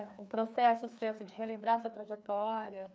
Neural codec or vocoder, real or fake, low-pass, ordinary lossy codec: codec, 16 kHz, 2 kbps, FreqCodec, larger model; fake; none; none